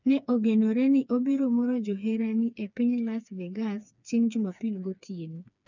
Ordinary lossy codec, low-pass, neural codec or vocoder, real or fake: none; 7.2 kHz; codec, 16 kHz, 4 kbps, FreqCodec, smaller model; fake